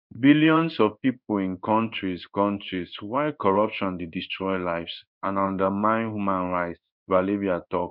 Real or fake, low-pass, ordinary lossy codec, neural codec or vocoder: fake; 5.4 kHz; none; codec, 16 kHz in and 24 kHz out, 1 kbps, XY-Tokenizer